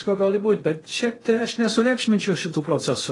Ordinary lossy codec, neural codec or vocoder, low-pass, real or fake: AAC, 32 kbps; codec, 16 kHz in and 24 kHz out, 0.6 kbps, FocalCodec, streaming, 2048 codes; 10.8 kHz; fake